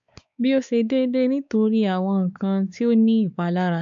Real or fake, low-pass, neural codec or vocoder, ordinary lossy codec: fake; 7.2 kHz; codec, 16 kHz, 4 kbps, X-Codec, WavLM features, trained on Multilingual LibriSpeech; AAC, 64 kbps